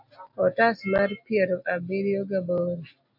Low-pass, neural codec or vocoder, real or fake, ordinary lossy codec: 5.4 kHz; none; real; AAC, 48 kbps